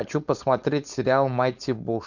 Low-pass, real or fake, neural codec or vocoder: 7.2 kHz; fake; codec, 16 kHz, 4.8 kbps, FACodec